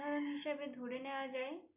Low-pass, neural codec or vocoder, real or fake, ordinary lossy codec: 3.6 kHz; none; real; none